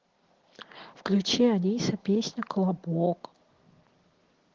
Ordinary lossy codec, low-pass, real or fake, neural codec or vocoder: Opus, 16 kbps; 7.2 kHz; real; none